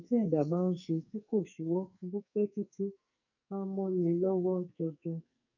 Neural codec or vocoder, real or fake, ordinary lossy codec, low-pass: codec, 44.1 kHz, 2.6 kbps, SNAC; fake; none; 7.2 kHz